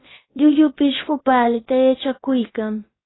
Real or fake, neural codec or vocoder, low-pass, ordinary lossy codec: fake; codec, 16 kHz, about 1 kbps, DyCAST, with the encoder's durations; 7.2 kHz; AAC, 16 kbps